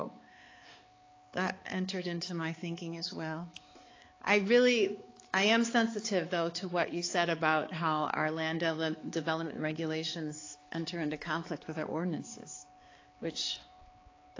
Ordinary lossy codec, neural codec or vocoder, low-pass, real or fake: AAC, 32 kbps; codec, 16 kHz, 4 kbps, X-Codec, HuBERT features, trained on balanced general audio; 7.2 kHz; fake